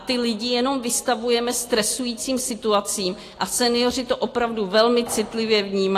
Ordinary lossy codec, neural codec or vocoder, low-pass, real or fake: AAC, 48 kbps; none; 14.4 kHz; real